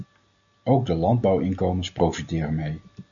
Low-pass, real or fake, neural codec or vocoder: 7.2 kHz; real; none